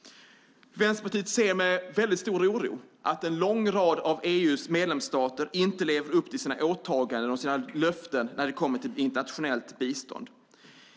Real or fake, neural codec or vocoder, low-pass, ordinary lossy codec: real; none; none; none